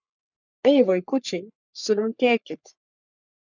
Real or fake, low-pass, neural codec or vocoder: fake; 7.2 kHz; codec, 44.1 kHz, 3.4 kbps, Pupu-Codec